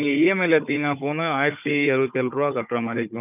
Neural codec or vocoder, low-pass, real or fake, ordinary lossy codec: codec, 16 kHz, 4 kbps, FunCodec, trained on Chinese and English, 50 frames a second; 3.6 kHz; fake; none